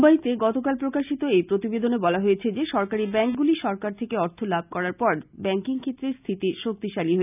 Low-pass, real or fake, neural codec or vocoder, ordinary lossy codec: 3.6 kHz; real; none; none